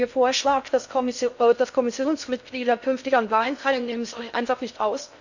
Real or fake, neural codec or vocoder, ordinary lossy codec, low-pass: fake; codec, 16 kHz in and 24 kHz out, 0.6 kbps, FocalCodec, streaming, 2048 codes; none; 7.2 kHz